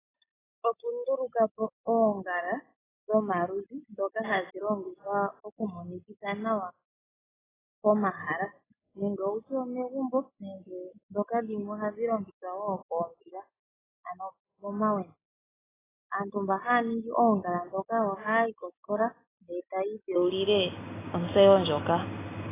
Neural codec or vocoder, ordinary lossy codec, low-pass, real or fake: none; AAC, 16 kbps; 3.6 kHz; real